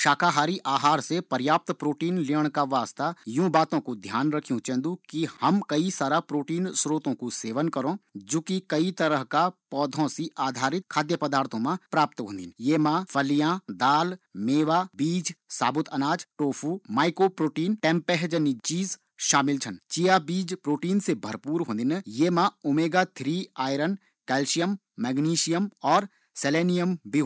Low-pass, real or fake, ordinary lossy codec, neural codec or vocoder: none; real; none; none